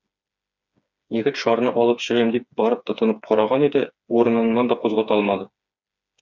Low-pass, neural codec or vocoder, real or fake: 7.2 kHz; codec, 16 kHz, 4 kbps, FreqCodec, smaller model; fake